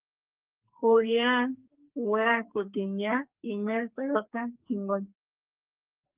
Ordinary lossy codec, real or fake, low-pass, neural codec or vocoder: Opus, 24 kbps; fake; 3.6 kHz; codec, 44.1 kHz, 2.6 kbps, SNAC